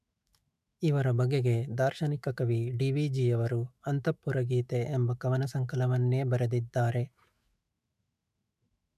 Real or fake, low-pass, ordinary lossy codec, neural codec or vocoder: fake; 14.4 kHz; none; autoencoder, 48 kHz, 128 numbers a frame, DAC-VAE, trained on Japanese speech